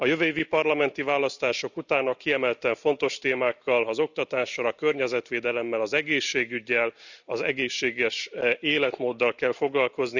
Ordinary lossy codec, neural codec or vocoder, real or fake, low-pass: none; none; real; 7.2 kHz